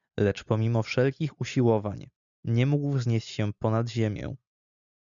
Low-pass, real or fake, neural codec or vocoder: 7.2 kHz; real; none